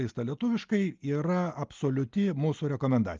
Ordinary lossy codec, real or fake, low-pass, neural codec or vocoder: Opus, 24 kbps; real; 7.2 kHz; none